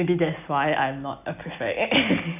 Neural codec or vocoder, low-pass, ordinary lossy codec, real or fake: none; 3.6 kHz; none; real